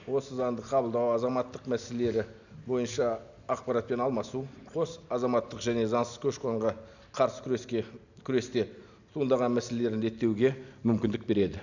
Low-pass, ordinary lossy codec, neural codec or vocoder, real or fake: 7.2 kHz; none; none; real